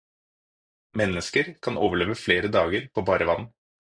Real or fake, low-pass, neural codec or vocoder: real; 9.9 kHz; none